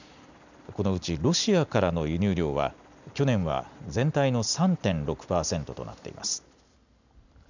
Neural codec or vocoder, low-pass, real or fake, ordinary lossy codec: none; 7.2 kHz; real; none